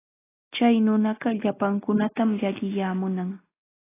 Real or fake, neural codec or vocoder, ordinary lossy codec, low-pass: real; none; AAC, 16 kbps; 3.6 kHz